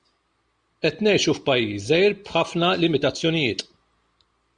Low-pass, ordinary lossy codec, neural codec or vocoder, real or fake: 9.9 kHz; Opus, 64 kbps; none; real